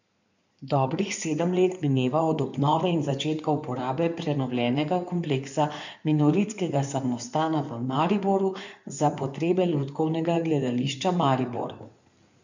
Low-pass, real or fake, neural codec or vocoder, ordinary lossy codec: 7.2 kHz; fake; codec, 16 kHz in and 24 kHz out, 2.2 kbps, FireRedTTS-2 codec; none